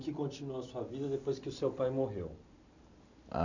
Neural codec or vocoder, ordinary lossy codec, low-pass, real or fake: none; none; 7.2 kHz; real